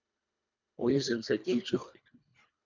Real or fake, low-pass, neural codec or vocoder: fake; 7.2 kHz; codec, 24 kHz, 1.5 kbps, HILCodec